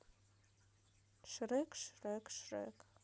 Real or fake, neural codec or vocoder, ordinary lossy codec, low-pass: real; none; none; none